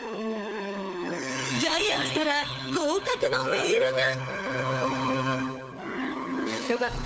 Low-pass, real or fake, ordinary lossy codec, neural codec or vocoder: none; fake; none; codec, 16 kHz, 4 kbps, FunCodec, trained on LibriTTS, 50 frames a second